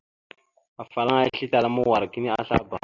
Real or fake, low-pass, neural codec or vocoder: real; 7.2 kHz; none